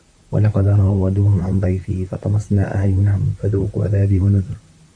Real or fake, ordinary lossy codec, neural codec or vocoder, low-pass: fake; Opus, 64 kbps; vocoder, 44.1 kHz, 128 mel bands, Pupu-Vocoder; 9.9 kHz